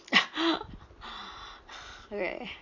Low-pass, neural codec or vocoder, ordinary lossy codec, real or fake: 7.2 kHz; none; none; real